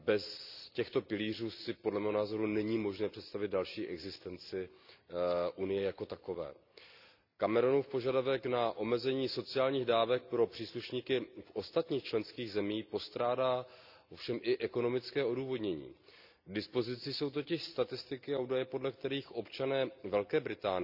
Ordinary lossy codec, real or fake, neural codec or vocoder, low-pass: none; real; none; 5.4 kHz